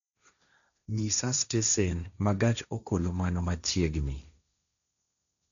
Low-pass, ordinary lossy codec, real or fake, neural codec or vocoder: 7.2 kHz; none; fake; codec, 16 kHz, 1.1 kbps, Voila-Tokenizer